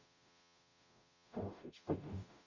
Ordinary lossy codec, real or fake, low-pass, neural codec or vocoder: none; fake; 7.2 kHz; codec, 44.1 kHz, 0.9 kbps, DAC